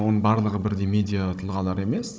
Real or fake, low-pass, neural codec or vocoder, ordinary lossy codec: fake; none; codec, 16 kHz, 16 kbps, FunCodec, trained on Chinese and English, 50 frames a second; none